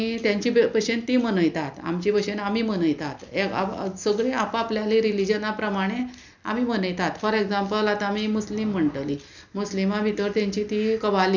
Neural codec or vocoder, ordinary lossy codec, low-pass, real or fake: none; none; 7.2 kHz; real